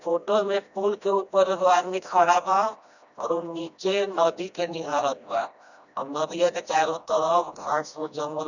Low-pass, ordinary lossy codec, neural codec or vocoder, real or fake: 7.2 kHz; none; codec, 16 kHz, 1 kbps, FreqCodec, smaller model; fake